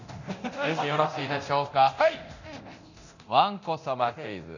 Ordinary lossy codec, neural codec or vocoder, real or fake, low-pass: none; codec, 24 kHz, 0.9 kbps, DualCodec; fake; 7.2 kHz